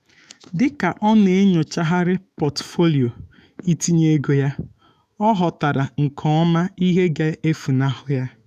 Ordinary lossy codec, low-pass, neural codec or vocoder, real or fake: none; 14.4 kHz; autoencoder, 48 kHz, 128 numbers a frame, DAC-VAE, trained on Japanese speech; fake